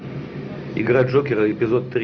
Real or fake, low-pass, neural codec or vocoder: real; 7.2 kHz; none